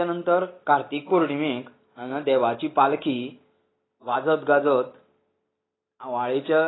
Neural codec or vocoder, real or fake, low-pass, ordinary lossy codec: none; real; 7.2 kHz; AAC, 16 kbps